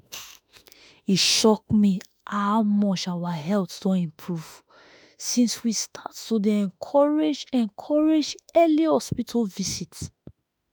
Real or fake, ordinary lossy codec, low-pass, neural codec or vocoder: fake; none; none; autoencoder, 48 kHz, 32 numbers a frame, DAC-VAE, trained on Japanese speech